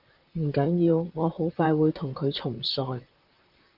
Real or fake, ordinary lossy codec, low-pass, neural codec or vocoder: fake; Opus, 24 kbps; 5.4 kHz; vocoder, 44.1 kHz, 128 mel bands, Pupu-Vocoder